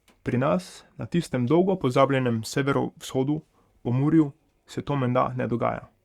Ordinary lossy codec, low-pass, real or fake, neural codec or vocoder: Opus, 64 kbps; 19.8 kHz; fake; codec, 44.1 kHz, 7.8 kbps, Pupu-Codec